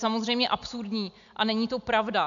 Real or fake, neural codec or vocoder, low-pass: real; none; 7.2 kHz